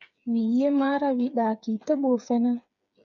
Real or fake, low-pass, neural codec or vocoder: fake; 7.2 kHz; codec, 16 kHz, 8 kbps, FreqCodec, smaller model